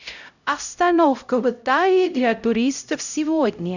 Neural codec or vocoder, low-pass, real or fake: codec, 16 kHz, 0.5 kbps, X-Codec, HuBERT features, trained on LibriSpeech; 7.2 kHz; fake